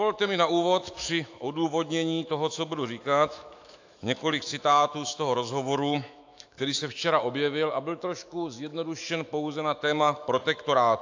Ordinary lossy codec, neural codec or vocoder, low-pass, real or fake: AAC, 48 kbps; autoencoder, 48 kHz, 128 numbers a frame, DAC-VAE, trained on Japanese speech; 7.2 kHz; fake